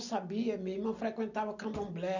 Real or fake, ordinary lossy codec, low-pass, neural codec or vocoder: real; none; 7.2 kHz; none